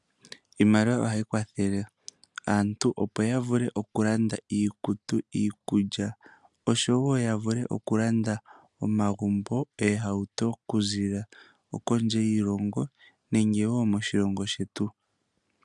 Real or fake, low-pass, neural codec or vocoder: real; 10.8 kHz; none